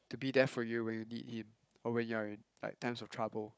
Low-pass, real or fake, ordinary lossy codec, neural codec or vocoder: none; fake; none; codec, 16 kHz, 16 kbps, FunCodec, trained on Chinese and English, 50 frames a second